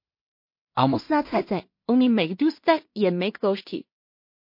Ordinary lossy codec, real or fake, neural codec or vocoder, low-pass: MP3, 32 kbps; fake; codec, 16 kHz in and 24 kHz out, 0.4 kbps, LongCat-Audio-Codec, two codebook decoder; 5.4 kHz